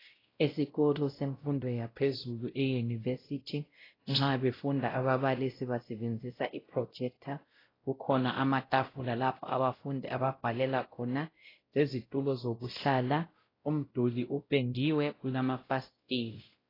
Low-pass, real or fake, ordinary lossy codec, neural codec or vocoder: 5.4 kHz; fake; AAC, 24 kbps; codec, 16 kHz, 0.5 kbps, X-Codec, WavLM features, trained on Multilingual LibriSpeech